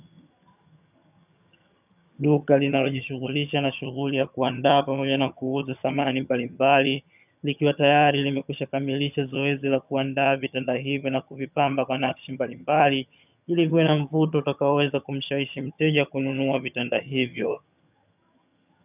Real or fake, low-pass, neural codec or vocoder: fake; 3.6 kHz; vocoder, 22.05 kHz, 80 mel bands, HiFi-GAN